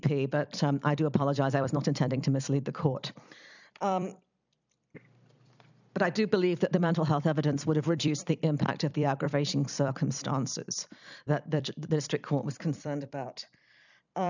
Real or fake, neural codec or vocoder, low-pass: fake; vocoder, 44.1 kHz, 128 mel bands every 256 samples, BigVGAN v2; 7.2 kHz